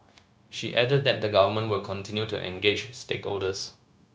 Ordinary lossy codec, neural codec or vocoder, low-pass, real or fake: none; codec, 16 kHz, 0.9 kbps, LongCat-Audio-Codec; none; fake